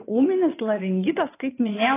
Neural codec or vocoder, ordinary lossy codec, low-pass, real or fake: vocoder, 22.05 kHz, 80 mel bands, WaveNeXt; AAC, 16 kbps; 3.6 kHz; fake